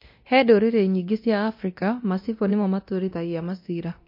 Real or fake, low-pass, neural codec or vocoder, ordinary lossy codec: fake; 5.4 kHz; codec, 24 kHz, 0.9 kbps, DualCodec; MP3, 32 kbps